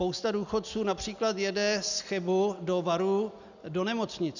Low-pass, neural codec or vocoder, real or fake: 7.2 kHz; none; real